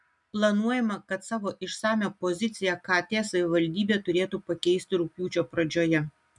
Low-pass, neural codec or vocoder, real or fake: 10.8 kHz; none; real